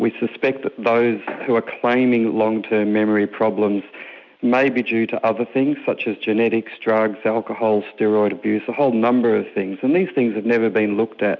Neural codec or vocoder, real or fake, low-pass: none; real; 7.2 kHz